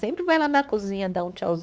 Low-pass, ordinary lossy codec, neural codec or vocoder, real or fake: none; none; codec, 16 kHz, 4 kbps, X-Codec, WavLM features, trained on Multilingual LibriSpeech; fake